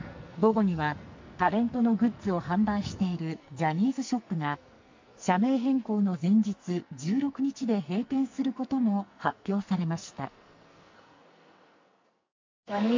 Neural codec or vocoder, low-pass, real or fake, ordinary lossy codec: codec, 44.1 kHz, 2.6 kbps, SNAC; 7.2 kHz; fake; MP3, 48 kbps